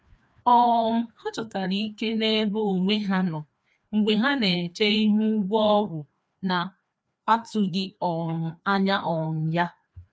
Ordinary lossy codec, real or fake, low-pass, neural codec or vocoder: none; fake; none; codec, 16 kHz, 2 kbps, FreqCodec, larger model